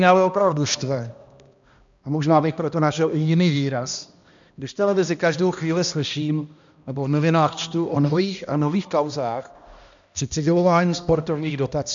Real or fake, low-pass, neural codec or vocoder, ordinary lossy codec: fake; 7.2 kHz; codec, 16 kHz, 1 kbps, X-Codec, HuBERT features, trained on balanced general audio; MP3, 64 kbps